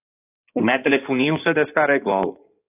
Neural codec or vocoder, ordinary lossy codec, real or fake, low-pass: codec, 16 kHz in and 24 kHz out, 2.2 kbps, FireRedTTS-2 codec; AAC, 24 kbps; fake; 3.6 kHz